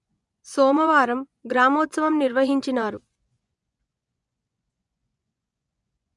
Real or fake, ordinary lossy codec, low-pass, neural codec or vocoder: fake; MP3, 96 kbps; 10.8 kHz; vocoder, 24 kHz, 100 mel bands, Vocos